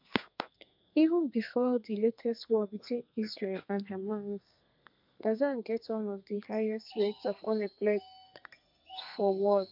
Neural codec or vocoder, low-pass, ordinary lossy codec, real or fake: codec, 32 kHz, 1.9 kbps, SNAC; 5.4 kHz; MP3, 48 kbps; fake